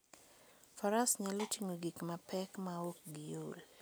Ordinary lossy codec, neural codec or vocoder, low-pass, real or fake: none; none; none; real